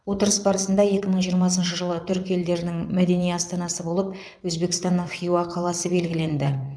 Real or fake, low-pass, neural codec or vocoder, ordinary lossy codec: fake; none; vocoder, 22.05 kHz, 80 mel bands, WaveNeXt; none